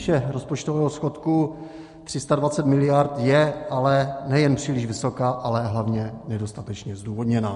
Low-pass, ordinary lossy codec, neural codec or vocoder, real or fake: 14.4 kHz; MP3, 48 kbps; none; real